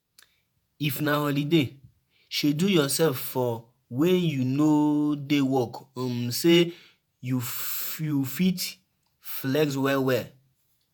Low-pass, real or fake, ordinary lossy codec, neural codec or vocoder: none; fake; none; vocoder, 48 kHz, 128 mel bands, Vocos